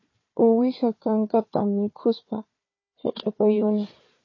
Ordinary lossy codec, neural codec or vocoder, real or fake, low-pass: MP3, 32 kbps; codec, 16 kHz, 4 kbps, FunCodec, trained on Chinese and English, 50 frames a second; fake; 7.2 kHz